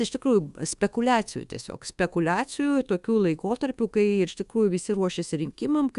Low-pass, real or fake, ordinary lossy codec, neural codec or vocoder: 10.8 kHz; fake; Opus, 64 kbps; codec, 24 kHz, 1.2 kbps, DualCodec